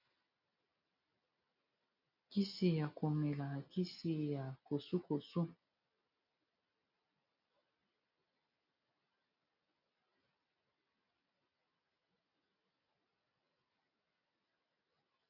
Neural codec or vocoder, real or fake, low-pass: none; real; 5.4 kHz